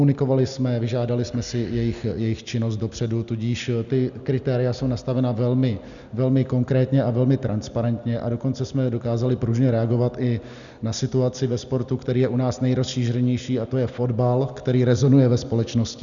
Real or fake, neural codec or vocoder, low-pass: real; none; 7.2 kHz